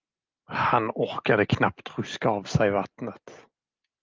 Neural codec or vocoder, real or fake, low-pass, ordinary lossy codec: none; real; 7.2 kHz; Opus, 32 kbps